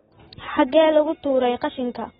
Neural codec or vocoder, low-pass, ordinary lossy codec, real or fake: none; 19.8 kHz; AAC, 16 kbps; real